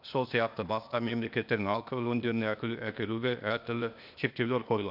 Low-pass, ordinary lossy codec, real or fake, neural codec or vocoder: 5.4 kHz; none; fake; codec, 16 kHz, 0.8 kbps, ZipCodec